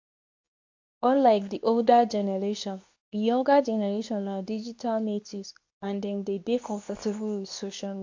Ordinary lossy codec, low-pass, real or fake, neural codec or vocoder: AAC, 48 kbps; 7.2 kHz; fake; codec, 24 kHz, 0.9 kbps, WavTokenizer, medium speech release version 2